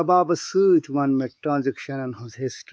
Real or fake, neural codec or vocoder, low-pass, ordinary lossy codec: fake; codec, 16 kHz, 4 kbps, X-Codec, WavLM features, trained on Multilingual LibriSpeech; none; none